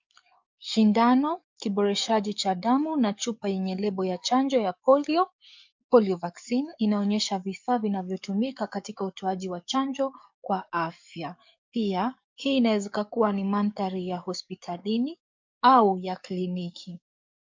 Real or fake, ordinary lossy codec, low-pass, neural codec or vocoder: fake; MP3, 64 kbps; 7.2 kHz; codec, 44.1 kHz, 7.8 kbps, DAC